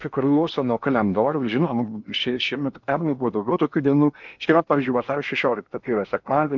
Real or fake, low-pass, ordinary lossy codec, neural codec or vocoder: fake; 7.2 kHz; MP3, 64 kbps; codec, 16 kHz in and 24 kHz out, 0.8 kbps, FocalCodec, streaming, 65536 codes